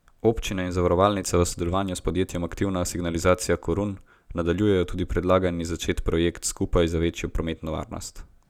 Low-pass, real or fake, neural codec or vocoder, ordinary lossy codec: 19.8 kHz; real; none; none